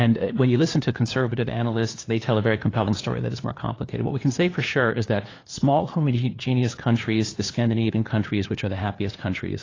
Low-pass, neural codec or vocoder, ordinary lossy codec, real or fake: 7.2 kHz; codec, 16 kHz, 2 kbps, FunCodec, trained on LibriTTS, 25 frames a second; AAC, 32 kbps; fake